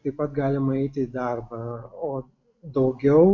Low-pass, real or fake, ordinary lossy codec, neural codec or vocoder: 7.2 kHz; real; MP3, 48 kbps; none